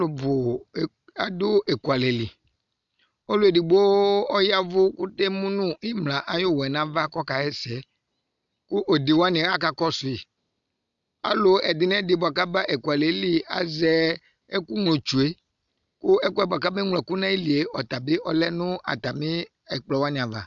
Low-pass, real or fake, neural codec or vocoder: 7.2 kHz; real; none